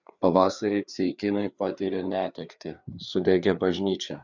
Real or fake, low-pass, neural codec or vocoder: fake; 7.2 kHz; codec, 16 kHz, 4 kbps, FreqCodec, larger model